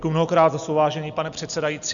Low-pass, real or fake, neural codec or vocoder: 7.2 kHz; real; none